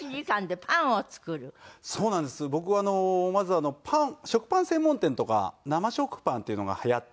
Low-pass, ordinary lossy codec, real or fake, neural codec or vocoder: none; none; real; none